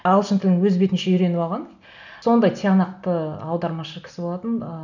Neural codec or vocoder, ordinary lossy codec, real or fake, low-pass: none; none; real; 7.2 kHz